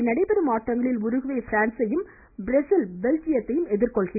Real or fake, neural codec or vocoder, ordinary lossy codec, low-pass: real; none; MP3, 32 kbps; 3.6 kHz